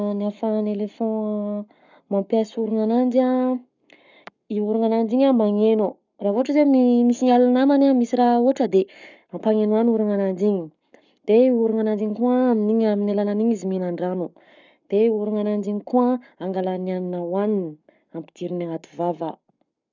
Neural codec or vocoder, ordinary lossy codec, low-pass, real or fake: codec, 44.1 kHz, 7.8 kbps, Pupu-Codec; none; 7.2 kHz; fake